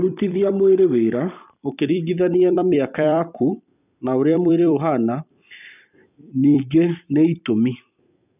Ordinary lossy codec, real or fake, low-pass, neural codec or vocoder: none; fake; 3.6 kHz; vocoder, 44.1 kHz, 128 mel bands every 256 samples, BigVGAN v2